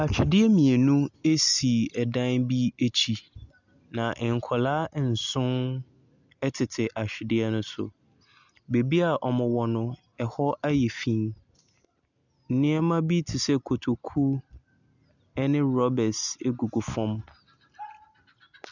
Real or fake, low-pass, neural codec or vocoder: real; 7.2 kHz; none